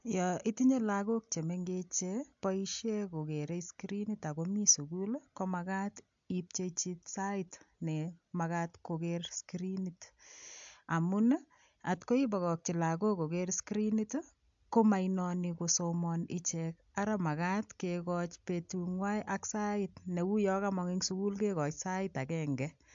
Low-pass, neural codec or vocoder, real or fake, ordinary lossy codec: 7.2 kHz; none; real; AAC, 64 kbps